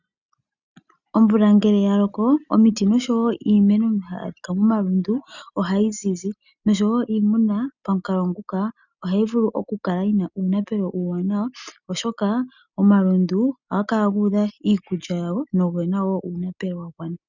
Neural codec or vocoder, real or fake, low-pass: none; real; 7.2 kHz